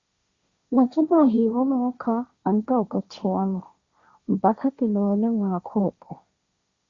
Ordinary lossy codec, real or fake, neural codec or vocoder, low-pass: Opus, 64 kbps; fake; codec, 16 kHz, 1.1 kbps, Voila-Tokenizer; 7.2 kHz